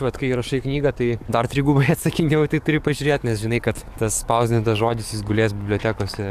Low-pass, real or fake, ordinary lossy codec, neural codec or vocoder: 14.4 kHz; fake; AAC, 96 kbps; codec, 44.1 kHz, 7.8 kbps, DAC